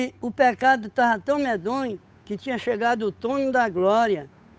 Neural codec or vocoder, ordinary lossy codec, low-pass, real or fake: codec, 16 kHz, 8 kbps, FunCodec, trained on Chinese and English, 25 frames a second; none; none; fake